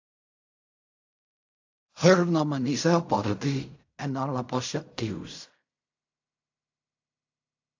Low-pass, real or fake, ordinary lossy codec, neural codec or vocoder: 7.2 kHz; fake; AAC, 48 kbps; codec, 16 kHz in and 24 kHz out, 0.4 kbps, LongCat-Audio-Codec, fine tuned four codebook decoder